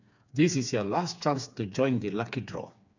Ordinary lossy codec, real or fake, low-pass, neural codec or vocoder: none; fake; 7.2 kHz; codec, 16 kHz, 4 kbps, FreqCodec, smaller model